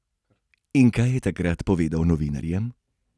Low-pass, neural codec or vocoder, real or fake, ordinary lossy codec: none; none; real; none